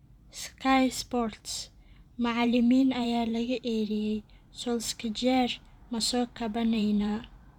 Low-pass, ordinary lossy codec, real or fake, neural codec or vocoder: 19.8 kHz; none; fake; vocoder, 44.1 kHz, 128 mel bands every 512 samples, BigVGAN v2